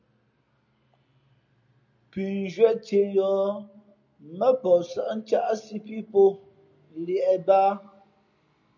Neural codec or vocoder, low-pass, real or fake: none; 7.2 kHz; real